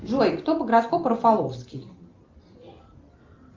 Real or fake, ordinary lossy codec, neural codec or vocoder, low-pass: real; Opus, 32 kbps; none; 7.2 kHz